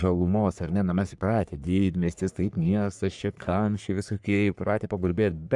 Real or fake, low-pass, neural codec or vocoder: fake; 10.8 kHz; codec, 44.1 kHz, 3.4 kbps, Pupu-Codec